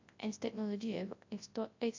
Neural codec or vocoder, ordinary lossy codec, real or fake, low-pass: codec, 24 kHz, 0.9 kbps, WavTokenizer, large speech release; AAC, 48 kbps; fake; 7.2 kHz